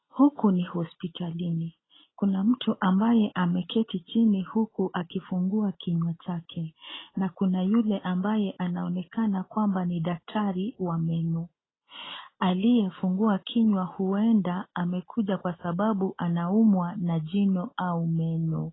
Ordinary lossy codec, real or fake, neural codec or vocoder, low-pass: AAC, 16 kbps; real; none; 7.2 kHz